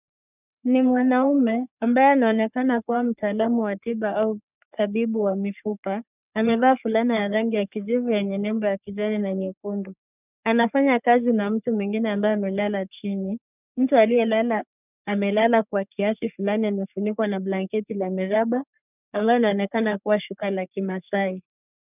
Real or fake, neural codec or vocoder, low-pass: fake; codec, 44.1 kHz, 3.4 kbps, Pupu-Codec; 3.6 kHz